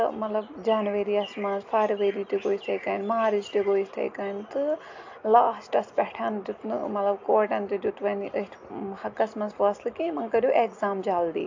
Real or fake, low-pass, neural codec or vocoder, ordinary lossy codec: real; 7.2 kHz; none; AAC, 48 kbps